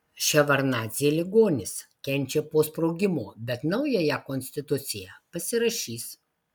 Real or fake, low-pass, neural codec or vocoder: real; 19.8 kHz; none